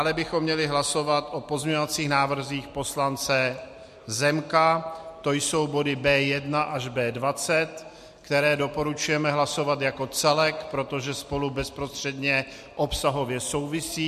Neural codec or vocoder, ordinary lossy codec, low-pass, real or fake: none; MP3, 64 kbps; 14.4 kHz; real